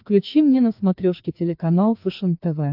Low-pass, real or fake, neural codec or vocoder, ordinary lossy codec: 5.4 kHz; fake; codec, 16 kHz, 2 kbps, FreqCodec, larger model; AAC, 48 kbps